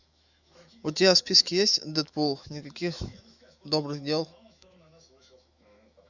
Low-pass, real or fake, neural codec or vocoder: 7.2 kHz; fake; autoencoder, 48 kHz, 128 numbers a frame, DAC-VAE, trained on Japanese speech